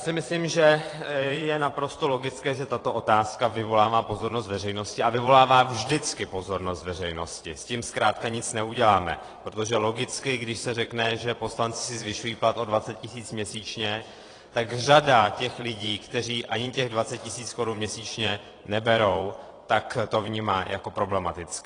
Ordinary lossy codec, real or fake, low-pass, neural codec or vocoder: AAC, 32 kbps; fake; 9.9 kHz; vocoder, 22.05 kHz, 80 mel bands, Vocos